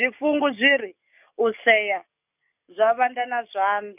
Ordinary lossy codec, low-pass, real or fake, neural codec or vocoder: none; 3.6 kHz; fake; codec, 44.1 kHz, 7.8 kbps, DAC